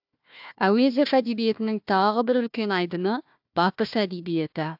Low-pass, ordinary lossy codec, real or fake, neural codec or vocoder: 5.4 kHz; AAC, 48 kbps; fake; codec, 16 kHz, 1 kbps, FunCodec, trained on Chinese and English, 50 frames a second